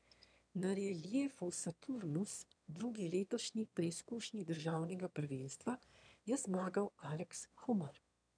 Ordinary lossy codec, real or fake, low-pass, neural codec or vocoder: none; fake; 9.9 kHz; autoencoder, 22.05 kHz, a latent of 192 numbers a frame, VITS, trained on one speaker